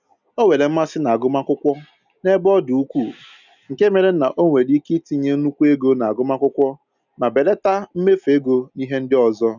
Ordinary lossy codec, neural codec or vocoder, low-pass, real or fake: none; none; 7.2 kHz; real